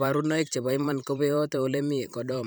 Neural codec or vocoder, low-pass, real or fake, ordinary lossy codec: vocoder, 44.1 kHz, 128 mel bands every 512 samples, BigVGAN v2; none; fake; none